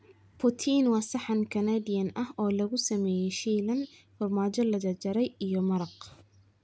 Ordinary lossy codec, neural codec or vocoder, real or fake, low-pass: none; none; real; none